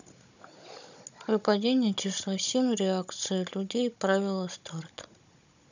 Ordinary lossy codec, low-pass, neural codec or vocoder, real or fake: none; 7.2 kHz; codec, 16 kHz, 16 kbps, FunCodec, trained on Chinese and English, 50 frames a second; fake